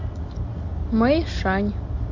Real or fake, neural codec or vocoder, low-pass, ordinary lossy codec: real; none; 7.2 kHz; MP3, 48 kbps